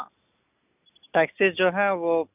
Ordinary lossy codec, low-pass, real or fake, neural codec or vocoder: none; 3.6 kHz; real; none